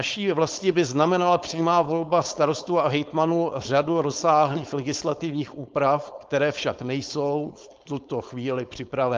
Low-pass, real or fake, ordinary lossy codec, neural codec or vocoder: 7.2 kHz; fake; Opus, 24 kbps; codec, 16 kHz, 4.8 kbps, FACodec